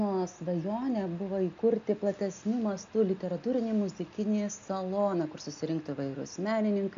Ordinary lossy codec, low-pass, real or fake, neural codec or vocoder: AAC, 64 kbps; 7.2 kHz; real; none